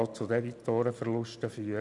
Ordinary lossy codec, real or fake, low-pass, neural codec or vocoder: none; real; 10.8 kHz; none